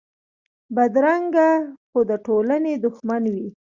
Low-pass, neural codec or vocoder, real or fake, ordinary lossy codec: 7.2 kHz; none; real; Opus, 64 kbps